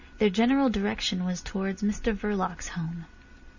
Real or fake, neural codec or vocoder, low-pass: real; none; 7.2 kHz